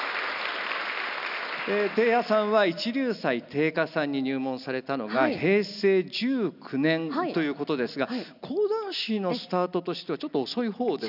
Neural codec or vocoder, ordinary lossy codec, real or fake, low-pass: none; none; real; 5.4 kHz